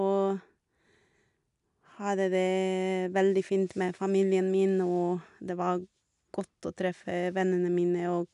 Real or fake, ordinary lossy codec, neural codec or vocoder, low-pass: real; none; none; 10.8 kHz